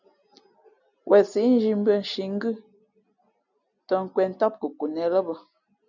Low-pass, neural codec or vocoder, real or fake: 7.2 kHz; none; real